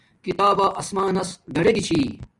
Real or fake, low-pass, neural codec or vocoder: real; 10.8 kHz; none